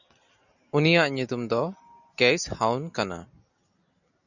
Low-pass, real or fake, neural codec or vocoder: 7.2 kHz; real; none